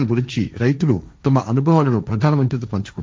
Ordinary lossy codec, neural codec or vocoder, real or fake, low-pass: none; codec, 16 kHz, 1.1 kbps, Voila-Tokenizer; fake; none